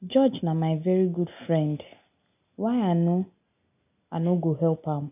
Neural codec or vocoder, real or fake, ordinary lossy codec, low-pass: none; real; none; 3.6 kHz